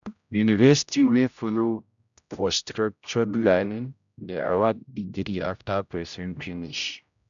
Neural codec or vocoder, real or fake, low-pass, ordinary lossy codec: codec, 16 kHz, 0.5 kbps, X-Codec, HuBERT features, trained on general audio; fake; 7.2 kHz; none